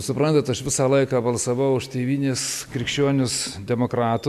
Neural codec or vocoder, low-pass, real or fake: none; 14.4 kHz; real